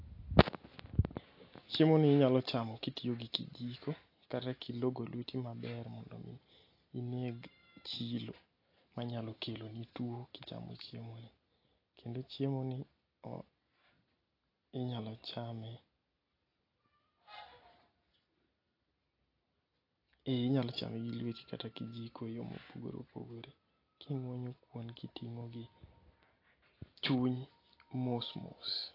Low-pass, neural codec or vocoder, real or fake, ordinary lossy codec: 5.4 kHz; none; real; AAC, 32 kbps